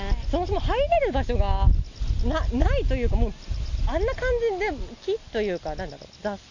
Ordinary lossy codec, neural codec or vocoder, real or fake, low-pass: none; none; real; 7.2 kHz